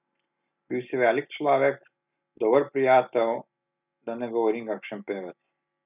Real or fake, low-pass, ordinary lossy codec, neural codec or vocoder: real; 3.6 kHz; none; none